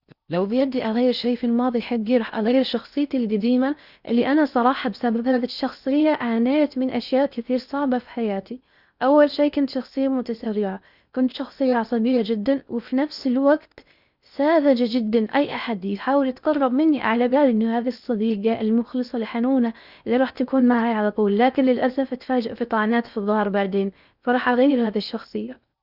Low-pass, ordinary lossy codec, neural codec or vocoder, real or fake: 5.4 kHz; Opus, 64 kbps; codec, 16 kHz in and 24 kHz out, 0.6 kbps, FocalCodec, streaming, 4096 codes; fake